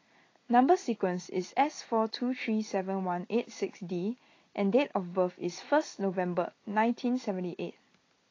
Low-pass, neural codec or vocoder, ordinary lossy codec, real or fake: 7.2 kHz; none; AAC, 32 kbps; real